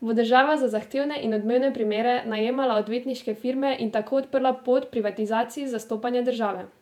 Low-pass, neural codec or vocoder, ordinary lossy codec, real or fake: 19.8 kHz; vocoder, 48 kHz, 128 mel bands, Vocos; none; fake